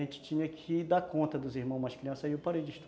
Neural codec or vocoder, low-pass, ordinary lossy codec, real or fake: none; none; none; real